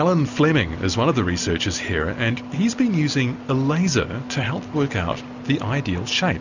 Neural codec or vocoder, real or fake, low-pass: none; real; 7.2 kHz